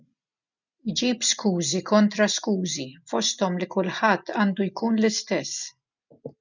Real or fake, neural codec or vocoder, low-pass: real; none; 7.2 kHz